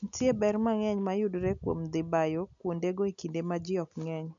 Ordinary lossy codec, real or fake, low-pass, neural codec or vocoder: none; real; 7.2 kHz; none